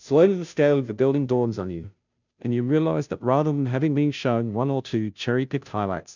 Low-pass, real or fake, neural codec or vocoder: 7.2 kHz; fake; codec, 16 kHz, 0.5 kbps, FunCodec, trained on Chinese and English, 25 frames a second